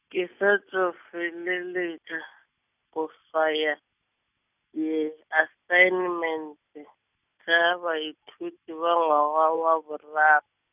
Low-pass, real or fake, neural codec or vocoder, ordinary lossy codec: 3.6 kHz; real; none; none